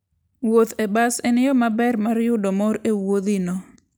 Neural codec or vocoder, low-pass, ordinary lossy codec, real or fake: none; none; none; real